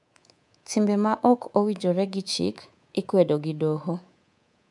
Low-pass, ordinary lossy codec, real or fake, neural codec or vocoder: 10.8 kHz; none; fake; codec, 24 kHz, 3.1 kbps, DualCodec